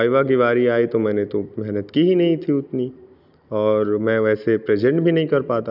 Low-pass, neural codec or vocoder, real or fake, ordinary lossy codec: 5.4 kHz; none; real; none